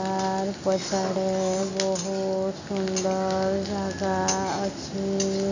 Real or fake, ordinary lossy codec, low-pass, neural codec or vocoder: real; none; 7.2 kHz; none